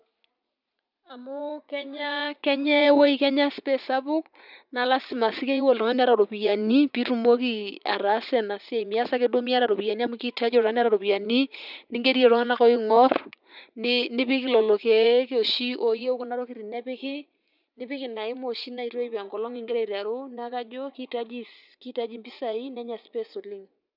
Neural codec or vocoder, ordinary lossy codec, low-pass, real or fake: vocoder, 44.1 kHz, 80 mel bands, Vocos; none; 5.4 kHz; fake